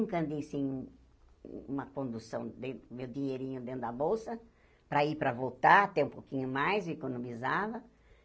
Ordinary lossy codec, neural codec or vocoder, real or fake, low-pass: none; none; real; none